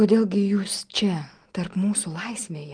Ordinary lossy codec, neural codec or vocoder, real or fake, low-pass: Opus, 24 kbps; none; real; 9.9 kHz